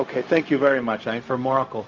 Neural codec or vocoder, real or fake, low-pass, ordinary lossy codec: codec, 16 kHz, 0.4 kbps, LongCat-Audio-Codec; fake; 7.2 kHz; Opus, 24 kbps